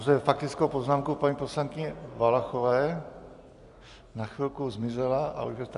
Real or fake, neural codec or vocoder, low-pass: real; none; 10.8 kHz